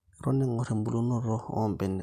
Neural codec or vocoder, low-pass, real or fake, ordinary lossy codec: none; 14.4 kHz; real; none